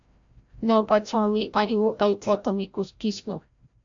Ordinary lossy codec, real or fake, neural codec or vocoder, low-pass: AAC, 64 kbps; fake; codec, 16 kHz, 0.5 kbps, FreqCodec, larger model; 7.2 kHz